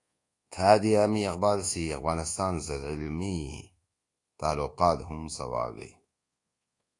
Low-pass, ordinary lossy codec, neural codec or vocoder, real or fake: 10.8 kHz; AAC, 48 kbps; codec, 24 kHz, 1.2 kbps, DualCodec; fake